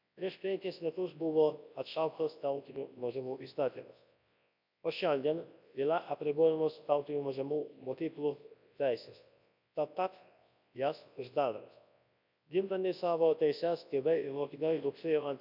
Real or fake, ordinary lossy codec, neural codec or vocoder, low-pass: fake; none; codec, 24 kHz, 0.9 kbps, WavTokenizer, large speech release; 5.4 kHz